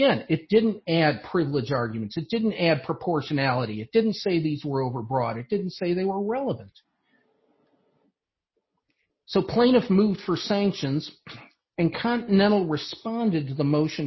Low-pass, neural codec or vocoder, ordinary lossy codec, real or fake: 7.2 kHz; none; MP3, 24 kbps; real